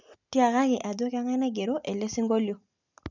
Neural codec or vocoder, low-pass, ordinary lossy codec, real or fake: none; 7.2 kHz; none; real